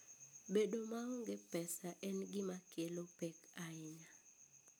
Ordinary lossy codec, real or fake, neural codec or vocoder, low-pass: none; real; none; none